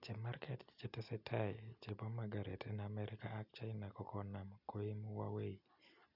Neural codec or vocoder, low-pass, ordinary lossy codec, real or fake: none; 5.4 kHz; none; real